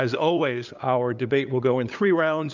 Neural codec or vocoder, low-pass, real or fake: codec, 16 kHz, 8 kbps, FunCodec, trained on LibriTTS, 25 frames a second; 7.2 kHz; fake